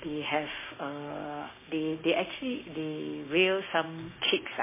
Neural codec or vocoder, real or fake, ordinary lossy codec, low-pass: codec, 16 kHz, 6 kbps, DAC; fake; MP3, 16 kbps; 3.6 kHz